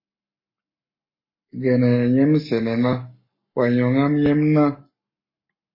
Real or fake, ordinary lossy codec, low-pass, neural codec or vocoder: fake; MP3, 24 kbps; 5.4 kHz; codec, 44.1 kHz, 7.8 kbps, Pupu-Codec